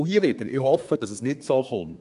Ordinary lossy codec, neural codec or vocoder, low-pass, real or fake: none; codec, 24 kHz, 1 kbps, SNAC; 10.8 kHz; fake